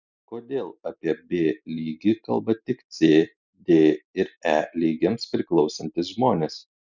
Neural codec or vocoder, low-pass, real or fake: none; 7.2 kHz; real